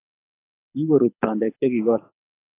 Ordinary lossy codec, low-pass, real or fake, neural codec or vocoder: AAC, 24 kbps; 3.6 kHz; fake; codec, 24 kHz, 6 kbps, HILCodec